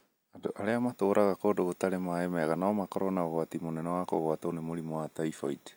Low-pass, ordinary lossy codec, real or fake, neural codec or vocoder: none; none; real; none